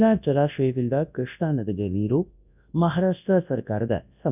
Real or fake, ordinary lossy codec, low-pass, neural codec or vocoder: fake; none; 3.6 kHz; codec, 24 kHz, 0.9 kbps, WavTokenizer, large speech release